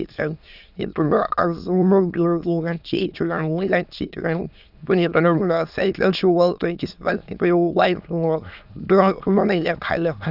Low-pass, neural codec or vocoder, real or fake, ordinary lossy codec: 5.4 kHz; autoencoder, 22.05 kHz, a latent of 192 numbers a frame, VITS, trained on many speakers; fake; none